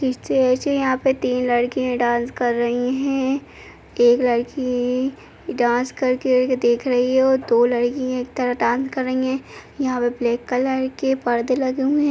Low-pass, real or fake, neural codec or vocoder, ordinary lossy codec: none; real; none; none